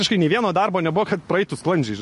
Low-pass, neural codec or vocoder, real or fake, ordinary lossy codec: 10.8 kHz; none; real; MP3, 48 kbps